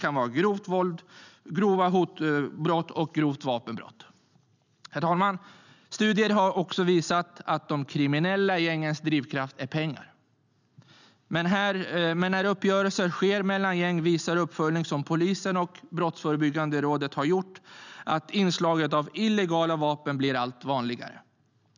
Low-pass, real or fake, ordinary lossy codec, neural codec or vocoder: 7.2 kHz; real; none; none